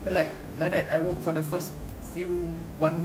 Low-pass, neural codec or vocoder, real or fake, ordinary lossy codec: 19.8 kHz; codec, 44.1 kHz, 2.6 kbps, DAC; fake; none